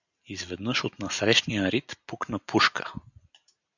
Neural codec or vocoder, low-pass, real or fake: none; 7.2 kHz; real